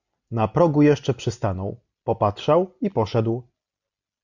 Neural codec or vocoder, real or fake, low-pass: none; real; 7.2 kHz